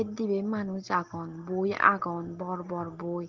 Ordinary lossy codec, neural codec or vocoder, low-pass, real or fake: Opus, 16 kbps; none; 7.2 kHz; real